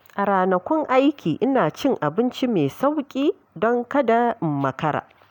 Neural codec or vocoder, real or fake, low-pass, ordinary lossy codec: none; real; 19.8 kHz; none